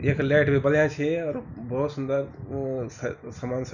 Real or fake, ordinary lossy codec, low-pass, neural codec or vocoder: real; none; 7.2 kHz; none